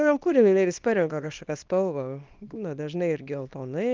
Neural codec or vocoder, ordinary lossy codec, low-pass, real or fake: codec, 24 kHz, 0.9 kbps, WavTokenizer, small release; Opus, 32 kbps; 7.2 kHz; fake